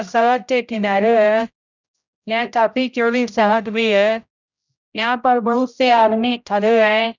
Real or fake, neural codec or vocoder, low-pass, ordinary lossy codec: fake; codec, 16 kHz, 0.5 kbps, X-Codec, HuBERT features, trained on general audio; 7.2 kHz; none